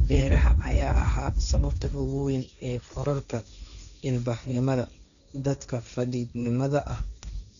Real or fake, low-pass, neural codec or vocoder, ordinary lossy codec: fake; 7.2 kHz; codec, 16 kHz, 1.1 kbps, Voila-Tokenizer; none